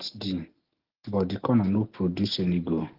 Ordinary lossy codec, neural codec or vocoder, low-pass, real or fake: Opus, 16 kbps; none; 5.4 kHz; real